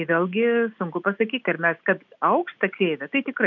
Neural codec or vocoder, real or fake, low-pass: none; real; 7.2 kHz